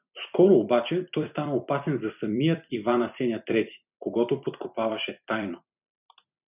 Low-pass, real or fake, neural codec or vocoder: 3.6 kHz; fake; vocoder, 44.1 kHz, 128 mel bands every 256 samples, BigVGAN v2